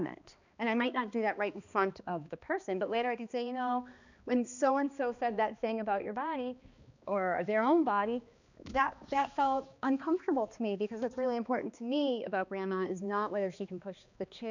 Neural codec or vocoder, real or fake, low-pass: codec, 16 kHz, 2 kbps, X-Codec, HuBERT features, trained on balanced general audio; fake; 7.2 kHz